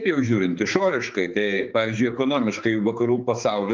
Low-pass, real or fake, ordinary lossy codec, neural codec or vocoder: 7.2 kHz; fake; Opus, 24 kbps; codec, 16 kHz, 4 kbps, X-Codec, HuBERT features, trained on general audio